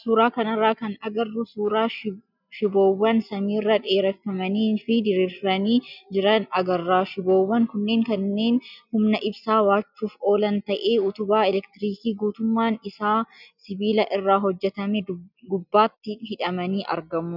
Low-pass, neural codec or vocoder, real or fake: 5.4 kHz; none; real